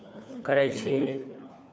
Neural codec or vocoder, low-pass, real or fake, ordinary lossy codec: codec, 16 kHz, 4 kbps, FunCodec, trained on LibriTTS, 50 frames a second; none; fake; none